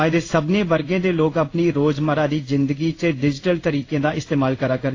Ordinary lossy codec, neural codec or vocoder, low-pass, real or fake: AAC, 32 kbps; codec, 16 kHz in and 24 kHz out, 1 kbps, XY-Tokenizer; 7.2 kHz; fake